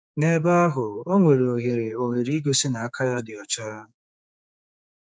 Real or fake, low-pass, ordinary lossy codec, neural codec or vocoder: fake; none; none; codec, 16 kHz, 4 kbps, X-Codec, HuBERT features, trained on general audio